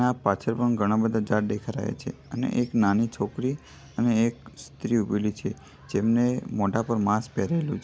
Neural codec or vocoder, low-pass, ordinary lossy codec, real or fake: none; none; none; real